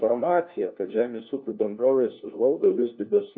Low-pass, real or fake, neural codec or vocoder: 7.2 kHz; fake; codec, 16 kHz, 1 kbps, FunCodec, trained on LibriTTS, 50 frames a second